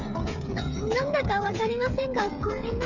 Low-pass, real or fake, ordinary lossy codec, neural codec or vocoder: 7.2 kHz; fake; none; codec, 16 kHz, 8 kbps, FreqCodec, smaller model